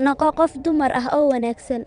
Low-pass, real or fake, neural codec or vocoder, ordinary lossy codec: 9.9 kHz; fake; vocoder, 22.05 kHz, 80 mel bands, WaveNeXt; none